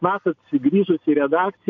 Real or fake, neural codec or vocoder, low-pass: real; none; 7.2 kHz